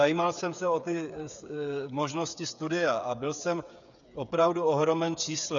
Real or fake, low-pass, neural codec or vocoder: fake; 7.2 kHz; codec, 16 kHz, 8 kbps, FreqCodec, smaller model